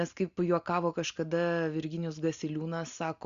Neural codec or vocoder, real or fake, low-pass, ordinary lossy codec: none; real; 7.2 kHz; Opus, 64 kbps